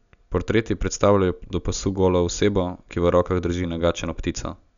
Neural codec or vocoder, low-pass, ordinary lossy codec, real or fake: none; 7.2 kHz; none; real